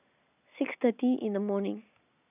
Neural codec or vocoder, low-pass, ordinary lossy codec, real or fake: none; 3.6 kHz; none; real